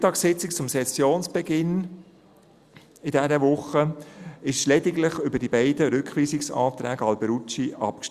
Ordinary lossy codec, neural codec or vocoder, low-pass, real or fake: Opus, 64 kbps; none; 14.4 kHz; real